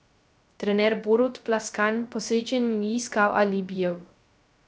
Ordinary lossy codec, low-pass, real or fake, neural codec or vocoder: none; none; fake; codec, 16 kHz, 0.3 kbps, FocalCodec